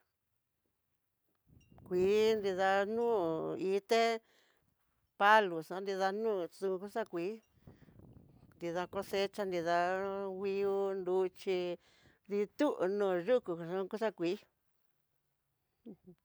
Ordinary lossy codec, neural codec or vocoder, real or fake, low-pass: none; none; real; none